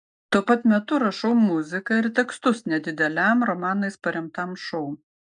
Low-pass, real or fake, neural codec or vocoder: 9.9 kHz; real; none